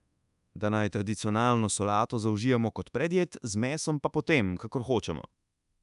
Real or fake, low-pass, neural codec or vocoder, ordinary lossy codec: fake; 10.8 kHz; codec, 24 kHz, 1.2 kbps, DualCodec; none